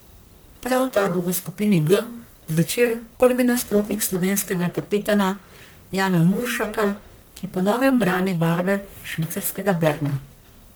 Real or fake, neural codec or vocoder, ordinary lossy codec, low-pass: fake; codec, 44.1 kHz, 1.7 kbps, Pupu-Codec; none; none